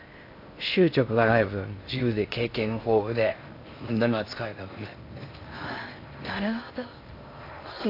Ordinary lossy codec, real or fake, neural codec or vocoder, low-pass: AAC, 32 kbps; fake; codec, 16 kHz in and 24 kHz out, 0.6 kbps, FocalCodec, streaming, 2048 codes; 5.4 kHz